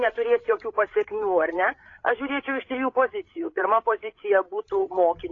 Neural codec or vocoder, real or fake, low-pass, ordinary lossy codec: codec, 16 kHz, 8 kbps, FreqCodec, larger model; fake; 7.2 kHz; AAC, 32 kbps